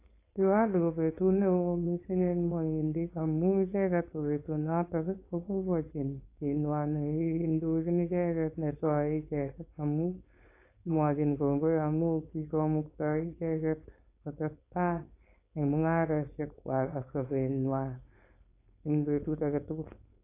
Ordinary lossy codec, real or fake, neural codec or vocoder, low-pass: AAC, 24 kbps; fake; codec, 16 kHz, 4.8 kbps, FACodec; 3.6 kHz